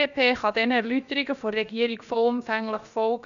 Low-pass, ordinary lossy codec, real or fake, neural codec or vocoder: 7.2 kHz; MP3, 96 kbps; fake; codec, 16 kHz, about 1 kbps, DyCAST, with the encoder's durations